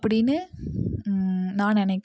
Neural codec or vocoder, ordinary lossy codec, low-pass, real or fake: none; none; none; real